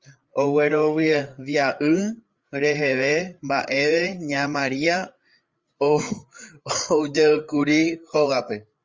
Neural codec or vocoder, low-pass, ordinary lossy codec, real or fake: codec, 16 kHz, 8 kbps, FreqCodec, larger model; 7.2 kHz; Opus, 32 kbps; fake